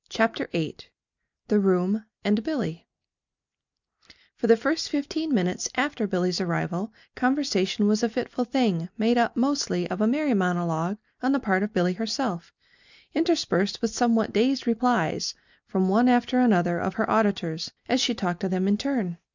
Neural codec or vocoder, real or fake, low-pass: none; real; 7.2 kHz